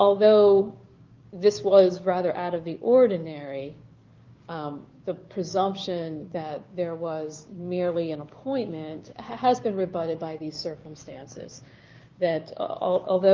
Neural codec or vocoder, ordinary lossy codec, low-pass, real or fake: codec, 16 kHz, 6 kbps, DAC; Opus, 24 kbps; 7.2 kHz; fake